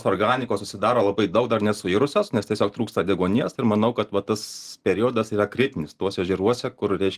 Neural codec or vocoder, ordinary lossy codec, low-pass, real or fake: none; Opus, 24 kbps; 14.4 kHz; real